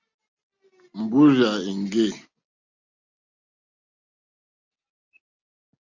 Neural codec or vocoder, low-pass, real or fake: none; 7.2 kHz; real